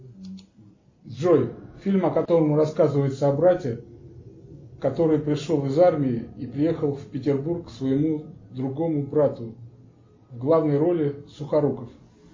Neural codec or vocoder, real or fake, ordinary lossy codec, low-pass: none; real; MP3, 32 kbps; 7.2 kHz